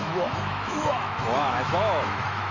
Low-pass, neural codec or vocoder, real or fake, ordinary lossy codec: 7.2 kHz; autoencoder, 48 kHz, 128 numbers a frame, DAC-VAE, trained on Japanese speech; fake; none